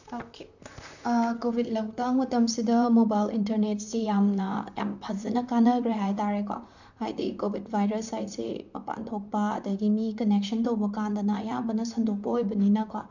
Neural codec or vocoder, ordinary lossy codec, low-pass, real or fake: vocoder, 44.1 kHz, 128 mel bands, Pupu-Vocoder; none; 7.2 kHz; fake